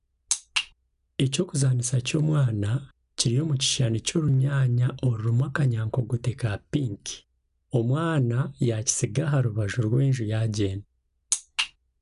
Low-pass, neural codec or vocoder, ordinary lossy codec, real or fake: 10.8 kHz; none; none; real